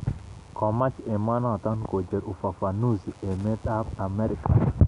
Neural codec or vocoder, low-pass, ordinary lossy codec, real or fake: none; 10.8 kHz; none; real